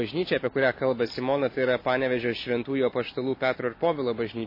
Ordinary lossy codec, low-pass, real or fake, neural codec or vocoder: MP3, 24 kbps; 5.4 kHz; real; none